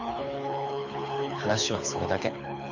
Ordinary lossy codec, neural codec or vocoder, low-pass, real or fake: none; codec, 24 kHz, 6 kbps, HILCodec; 7.2 kHz; fake